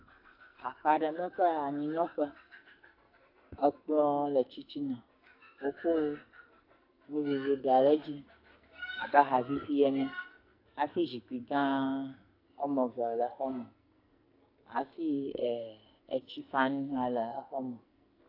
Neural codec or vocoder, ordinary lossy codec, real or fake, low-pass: codec, 44.1 kHz, 2.6 kbps, SNAC; AAC, 32 kbps; fake; 5.4 kHz